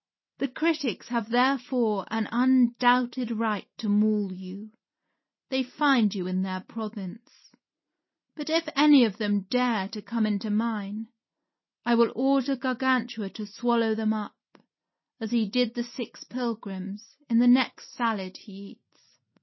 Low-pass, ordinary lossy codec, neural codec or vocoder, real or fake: 7.2 kHz; MP3, 24 kbps; none; real